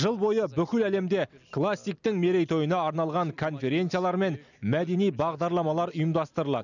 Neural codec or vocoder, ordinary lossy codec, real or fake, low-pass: none; none; real; 7.2 kHz